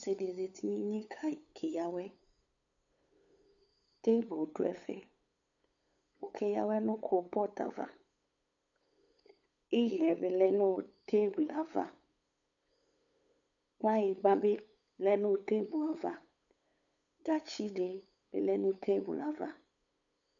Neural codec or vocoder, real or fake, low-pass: codec, 16 kHz, 8 kbps, FunCodec, trained on LibriTTS, 25 frames a second; fake; 7.2 kHz